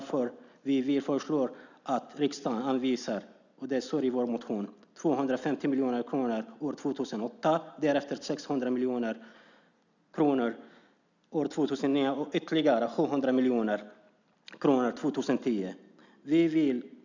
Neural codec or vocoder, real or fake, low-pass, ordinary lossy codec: none; real; 7.2 kHz; none